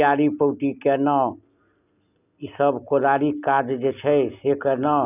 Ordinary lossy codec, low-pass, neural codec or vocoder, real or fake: none; 3.6 kHz; none; real